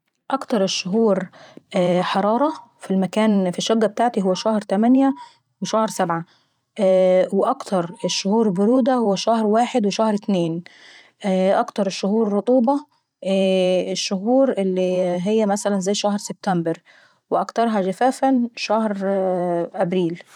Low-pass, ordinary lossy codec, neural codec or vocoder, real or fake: 19.8 kHz; none; vocoder, 44.1 kHz, 128 mel bands every 512 samples, BigVGAN v2; fake